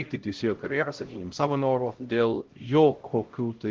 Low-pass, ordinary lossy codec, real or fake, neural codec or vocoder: 7.2 kHz; Opus, 16 kbps; fake; codec, 16 kHz, 0.5 kbps, X-Codec, HuBERT features, trained on LibriSpeech